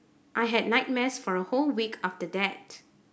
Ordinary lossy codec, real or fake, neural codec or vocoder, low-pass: none; real; none; none